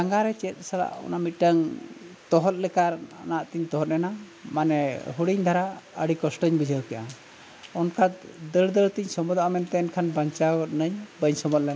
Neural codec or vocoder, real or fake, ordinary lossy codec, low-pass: none; real; none; none